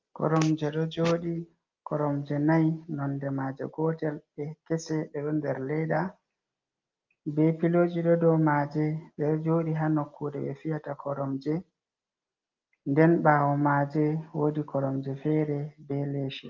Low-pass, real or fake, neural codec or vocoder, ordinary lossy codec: 7.2 kHz; real; none; Opus, 24 kbps